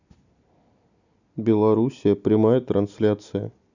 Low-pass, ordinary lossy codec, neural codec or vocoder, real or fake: 7.2 kHz; none; none; real